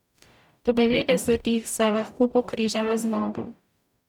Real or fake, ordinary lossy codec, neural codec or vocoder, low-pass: fake; none; codec, 44.1 kHz, 0.9 kbps, DAC; 19.8 kHz